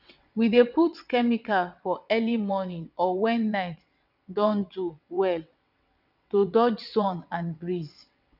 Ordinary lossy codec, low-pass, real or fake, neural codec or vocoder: none; 5.4 kHz; fake; vocoder, 22.05 kHz, 80 mel bands, WaveNeXt